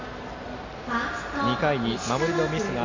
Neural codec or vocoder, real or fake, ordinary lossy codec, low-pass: none; real; AAC, 32 kbps; 7.2 kHz